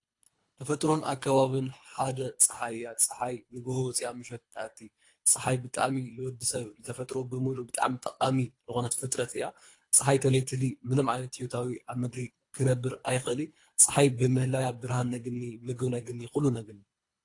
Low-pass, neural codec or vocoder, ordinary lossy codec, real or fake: 10.8 kHz; codec, 24 kHz, 3 kbps, HILCodec; AAC, 48 kbps; fake